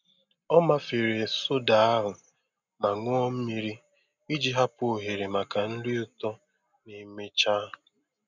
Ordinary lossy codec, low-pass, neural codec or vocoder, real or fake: none; 7.2 kHz; none; real